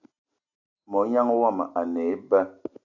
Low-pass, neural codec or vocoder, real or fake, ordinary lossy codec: 7.2 kHz; none; real; AAC, 48 kbps